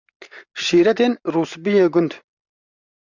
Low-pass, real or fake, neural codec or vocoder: 7.2 kHz; fake; vocoder, 44.1 kHz, 128 mel bands every 512 samples, BigVGAN v2